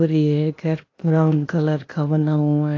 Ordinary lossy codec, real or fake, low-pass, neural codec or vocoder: AAC, 32 kbps; fake; 7.2 kHz; codec, 16 kHz, 0.8 kbps, ZipCodec